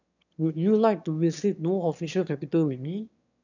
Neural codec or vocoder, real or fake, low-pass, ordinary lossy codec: autoencoder, 22.05 kHz, a latent of 192 numbers a frame, VITS, trained on one speaker; fake; 7.2 kHz; none